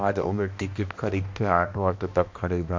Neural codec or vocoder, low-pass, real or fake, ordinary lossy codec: codec, 16 kHz, 1 kbps, X-Codec, HuBERT features, trained on balanced general audio; 7.2 kHz; fake; MP3, 48 kbps